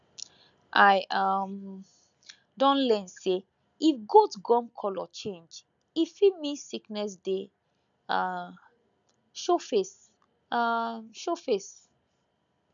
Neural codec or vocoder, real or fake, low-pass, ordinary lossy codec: none; real; 7.2 kHz; none